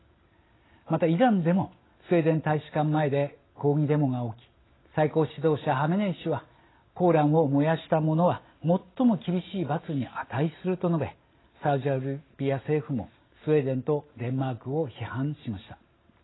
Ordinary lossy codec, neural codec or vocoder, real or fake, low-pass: AAC, 16 kbps; none; real; 7.2 kHz